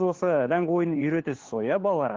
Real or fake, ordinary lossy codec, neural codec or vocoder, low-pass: fake; Opus, 16 kbps; codec, 16 kHz, 4 kbps, FunCodec, trained on LibriTTS, 50 frames a second; 7.2 kHz